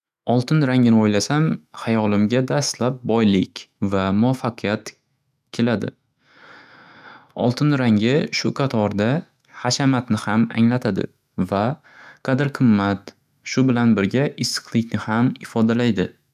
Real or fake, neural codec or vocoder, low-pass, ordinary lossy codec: fake; autoencoder, 48 kHz, 128 numbers a frame, DAC-VAE, trained on Japanese speech; 14.4 kHz; none